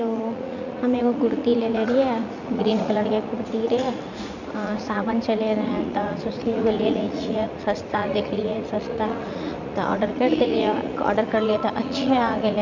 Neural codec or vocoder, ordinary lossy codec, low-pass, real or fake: vocoder, 44.1 kHz, 80 mel bands, Vocos; none; 7.2 kHz; fake